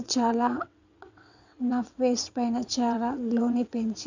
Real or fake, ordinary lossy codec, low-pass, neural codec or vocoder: fake; none; 7.2 kHz; vocoder, 22.05 kHz, 80 mel bands, WaveNeXt